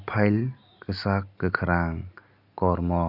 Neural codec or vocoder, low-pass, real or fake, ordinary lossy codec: none; 5.4 kHz; real; none